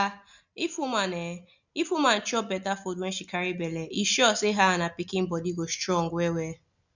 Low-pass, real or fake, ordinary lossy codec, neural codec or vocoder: 7.2 kHz; real; none; none